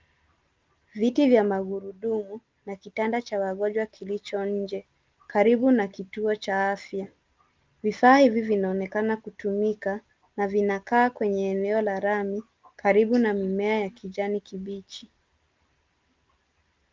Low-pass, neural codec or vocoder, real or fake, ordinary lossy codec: 7.2 kHz; none; real; Opus, 32 kbps